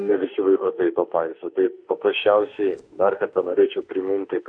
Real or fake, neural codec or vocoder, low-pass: fake; autoencoder, 48 kHz, 32 numbers a frame, DAC-VAE, trained on Japanese speech; 9.9 kHz